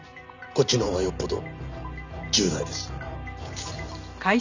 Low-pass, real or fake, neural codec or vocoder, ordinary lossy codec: 7.2 kHz; real; none; none